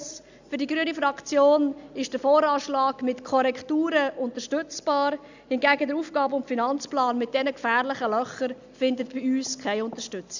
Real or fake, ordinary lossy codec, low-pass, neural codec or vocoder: real; none; 7.2 kHz; none